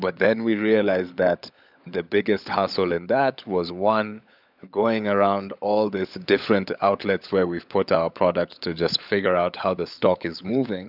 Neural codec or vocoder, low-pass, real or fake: codec, 16 kHz, 16 kbps, FunCodec, trained on LibriTTS, 50 frames a second; 5.4 kHz; fake